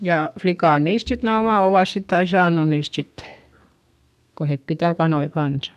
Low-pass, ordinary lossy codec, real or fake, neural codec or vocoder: 14.4 kHz; none; fake; codec, 32 kHz, 1.9 kbps, SNAC